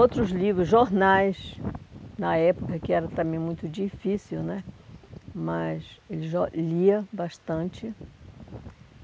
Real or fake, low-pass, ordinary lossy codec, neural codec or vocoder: real; none; none; none